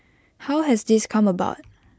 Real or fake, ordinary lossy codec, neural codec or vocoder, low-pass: real; none; none; none